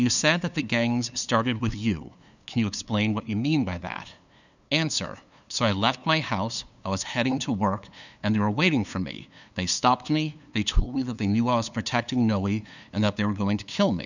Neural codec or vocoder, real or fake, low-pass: codec, 16 kHz, 2 kbps, FunCodec, trained on LibriTTS, 25 frames a second; fake; 7.2 kHz